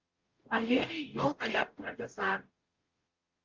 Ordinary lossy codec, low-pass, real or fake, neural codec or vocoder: Opus, 32 kbps; 7.2 kHz; fake; codec, 44.1 kHz, 0.9 kbps, DAC